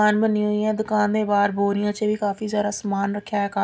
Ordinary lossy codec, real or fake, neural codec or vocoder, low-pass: none; real; none; none